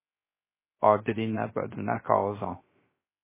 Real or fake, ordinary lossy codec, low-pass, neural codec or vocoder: fake; MP3, 16 kbps; 3.6 kHz; codec, 16 kHz, 0.3 kbps, FocalCodec